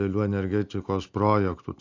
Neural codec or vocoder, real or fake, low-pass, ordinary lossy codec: none; real; 7.2 kHz; AAC, 48 kbps